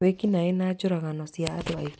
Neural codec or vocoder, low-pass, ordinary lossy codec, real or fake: none; none; none; real